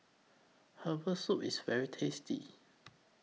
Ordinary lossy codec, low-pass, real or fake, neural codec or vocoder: none; none; real; none